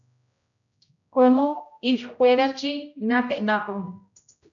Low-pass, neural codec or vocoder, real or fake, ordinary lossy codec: 7.2 kHz; codec, 16 kHz, 0.5 kbps, X-Codec, HuBERT features, trained on general audio; fake; MP3, 96 kbps